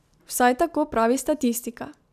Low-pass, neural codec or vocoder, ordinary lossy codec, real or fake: 14.4 kHz; none; none; real